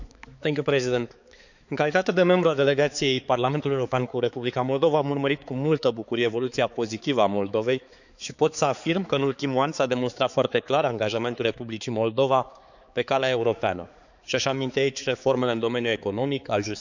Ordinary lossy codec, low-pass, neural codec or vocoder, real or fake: none; 7.2 kHz; codec, 16 kHz, 4 kbps, X-Codec, HuBERT features, trained on balanced general audio; fake